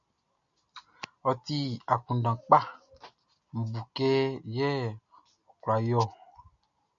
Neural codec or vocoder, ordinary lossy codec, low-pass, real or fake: none; MP3, 96 kbps; 7.2 kHz; real